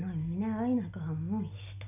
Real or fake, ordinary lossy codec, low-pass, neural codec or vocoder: real; none; 3.6 kHz; none